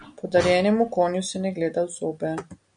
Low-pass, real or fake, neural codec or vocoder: 9.9 kHz; real; none